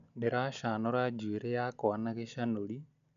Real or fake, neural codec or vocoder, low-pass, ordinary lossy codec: real; none; 7.2 kHz; none